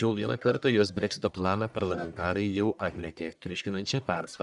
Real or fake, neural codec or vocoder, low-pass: fake; codec, 44.1 kHz, 1.7 kbps, Pupu-Codec; 10.8 kHz